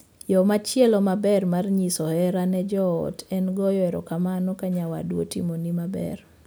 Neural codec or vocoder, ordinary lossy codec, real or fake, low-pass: none; none; real; none